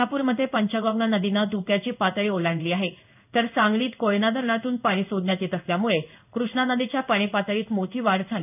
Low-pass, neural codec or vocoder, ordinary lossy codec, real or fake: 3.6 kHz; codec, 16 kHz in and 24 kHz out, 1 kbps, XY-Tokenizer; none; fake